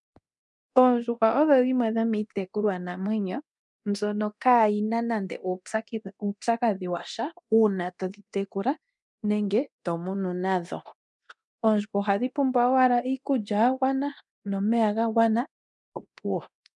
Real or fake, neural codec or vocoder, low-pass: fake; codec, 24 kHz, 0.9 kbps, DualCodec; 10.8 kHz